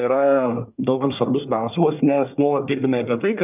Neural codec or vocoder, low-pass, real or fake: codec, 24 kHz, 1 kbps, SNAC; 3.6 kHz; fake